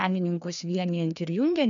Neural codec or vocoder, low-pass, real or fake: codec, 16 kHz, 2 kbps, FreqCodec, larger model; 7.2 kHz; fake